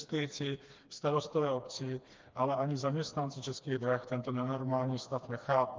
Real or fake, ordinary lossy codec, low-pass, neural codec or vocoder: fake; Opus, 32 kbps; 7.2 kHz; codec, 16 kHz, 2 kbps, FreqCodec, smaller model